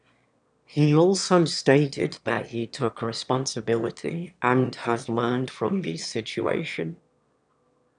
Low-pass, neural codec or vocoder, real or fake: 9.9 kHz; autoencoder, 22.05 kHz, a latent of 192 numbers a frame, VITS, trained on one speaker; fake